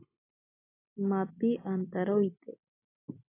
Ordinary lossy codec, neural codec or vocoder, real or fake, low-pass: AAC, 16 kbps; none; real; 3.6 kHz